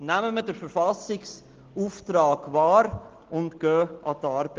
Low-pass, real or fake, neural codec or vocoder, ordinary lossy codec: 7.2 kHz; real; none; Opus, 16 kbps